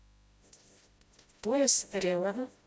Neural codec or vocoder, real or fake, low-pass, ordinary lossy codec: codec, 16 kHz, 0.5 kbps, FreqCodec, smaller model; fake; none; none